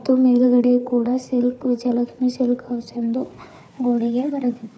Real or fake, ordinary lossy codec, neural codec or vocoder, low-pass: fake; none; codec, 16 kHz, 4 kbps, FunCodec, trained on Chinese and English, 50 frames a second; none